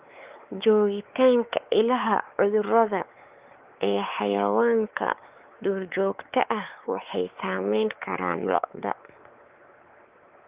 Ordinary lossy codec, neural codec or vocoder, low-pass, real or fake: Opus, 32 kbps; codec, 16 kHz, 4 kbps, X-Codec, WavLM features, trained on Multilingual LibriSpeech; 3.6 kHz; fake